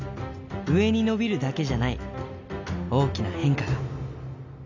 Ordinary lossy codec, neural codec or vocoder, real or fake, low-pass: none; none; real; 7.2 kHz